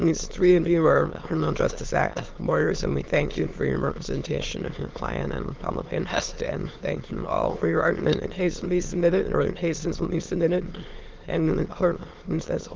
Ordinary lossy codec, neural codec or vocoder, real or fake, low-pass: Opus, 16 kbps; autoencoder, 22.05 kHz, a latent of 192 numbers a frame, VITS, trained on many speakers; fake; 7.2 kHz